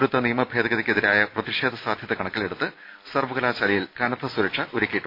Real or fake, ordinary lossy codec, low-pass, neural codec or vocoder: real; AAC, 32 kbps; 5.4 kHz; none